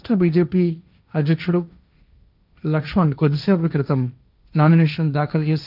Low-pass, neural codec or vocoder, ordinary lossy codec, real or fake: 5.4 kHz; codec, 16 kHz, 1.1 kbps, Voila-Tokenizer; none; fake